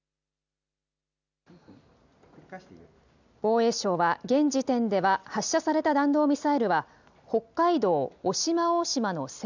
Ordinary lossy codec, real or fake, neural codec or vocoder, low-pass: none; real; none; 7.2 kHz